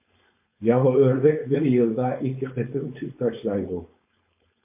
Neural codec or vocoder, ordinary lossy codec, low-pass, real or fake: codec, 16 kHz, 4.8 kbps, FACodec; MP3, 24 kbps; 3.6 kHz; fake